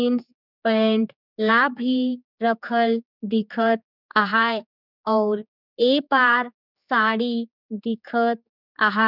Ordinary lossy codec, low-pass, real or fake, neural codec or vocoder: none; 5.4 kHz; fake; codec, 16 kHz, 4 kbps, X-Codec, HuBERT features, trained on general audio